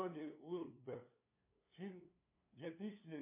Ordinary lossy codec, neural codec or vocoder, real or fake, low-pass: MP3, 24 kbps; codec, 16 kHz, 2 kbps, FunCodec, trained on LibriTTS, 25 frames a second; fake; 3.6 kHz